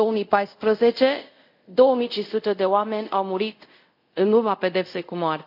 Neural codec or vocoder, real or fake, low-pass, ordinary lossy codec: codec, 24 kHz, 0.5 kbps, DualCodec; fake; 5.4 kHz; none